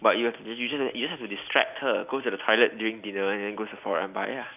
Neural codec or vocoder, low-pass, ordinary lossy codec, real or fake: none; 3.6 kHz; none; real